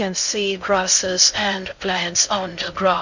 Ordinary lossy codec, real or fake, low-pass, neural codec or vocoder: none; fake; 7.2 kHz; codec, 16 kHz in and 24 kHz out, 0.6 kbps, FocalCodec, streaming, 2048 codes